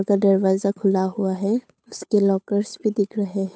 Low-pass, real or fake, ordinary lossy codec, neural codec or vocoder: none; fake; none; codec, 16 kHz, 8 kbps, FunCodec, trained on Chinese and English, 25 frames a second